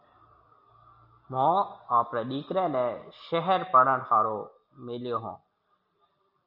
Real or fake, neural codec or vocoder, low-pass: real; none; 5.4 kHz